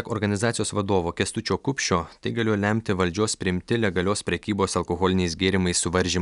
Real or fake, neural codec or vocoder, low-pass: real; none; 10.8 kHz